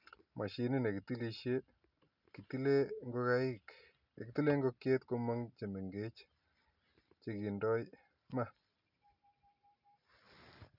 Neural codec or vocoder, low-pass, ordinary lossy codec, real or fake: none; 5.4 kHz; none; real